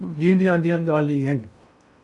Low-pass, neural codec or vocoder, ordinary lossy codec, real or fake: 10.8 kHz; codec, 16 kHz in and 24 kHz out, 0.6 kbps, FocalCodec, streaming, 2048 codes; MP3, 96 kbps; fake